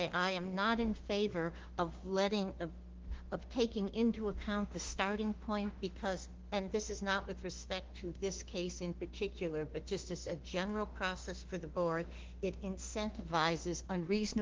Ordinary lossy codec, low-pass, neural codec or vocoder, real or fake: Opus, 16 kbps; 7.2 kHz; autoencoder, 48 kHz, 32 numbers a frame, DAC-VAE, trained on Japanese speech; fake